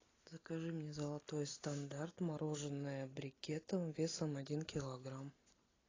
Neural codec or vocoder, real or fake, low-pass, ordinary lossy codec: none; real; 7.2 kHz; AAC, 32 kbps